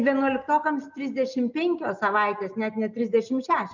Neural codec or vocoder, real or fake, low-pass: none; real; 7.2 kHz